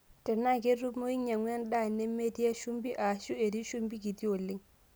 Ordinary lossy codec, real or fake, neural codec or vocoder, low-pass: none; real; none; none